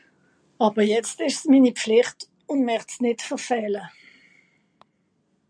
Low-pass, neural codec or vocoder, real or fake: 9.9 kHz; none; real